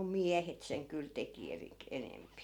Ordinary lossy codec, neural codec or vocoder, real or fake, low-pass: none; none; real; 19.8 kHz